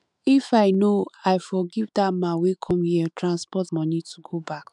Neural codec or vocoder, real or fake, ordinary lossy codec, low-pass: autoencoder, 48 kHz, 128 numbers a frame, DAC-VAE, trained on Japanese speech; fake; none; 10.8 kHz